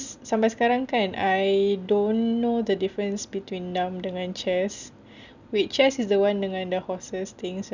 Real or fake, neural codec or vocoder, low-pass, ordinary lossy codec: real; none; 7.2 kHz; none